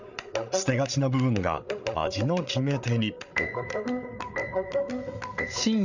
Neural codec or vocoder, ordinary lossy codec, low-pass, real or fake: codec, 16 kHz, 8 kbps, FreqCodec, larger model; none; 7.2 kHz; fake